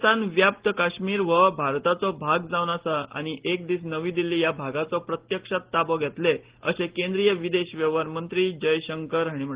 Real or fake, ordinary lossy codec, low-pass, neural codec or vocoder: real; Opus, 16 kbps; 3.6 kHz; none